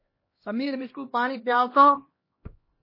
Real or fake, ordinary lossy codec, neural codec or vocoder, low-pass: fake; MP3, 24 kbps; codec, 16 kHz, 1 kbps, X-Codec, HuBERT features, trained on LibriSpeech; 5.4 kHz